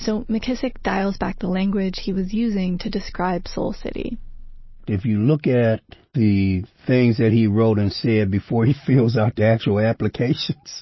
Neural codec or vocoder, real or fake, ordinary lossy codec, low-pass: none; real; MP3, 24 kbps; 7.2 kHz